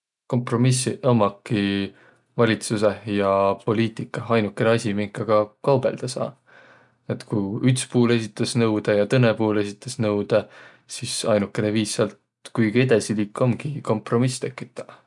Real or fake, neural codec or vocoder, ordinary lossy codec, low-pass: real; none; none; 10.8 kHz